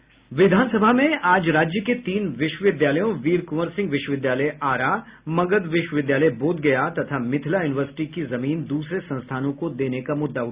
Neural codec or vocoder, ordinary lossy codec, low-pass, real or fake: none; Opus, 64 kbps; 3.6 kHz; real